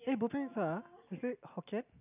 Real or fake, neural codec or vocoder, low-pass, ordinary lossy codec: real; none; 3.6 kHz; none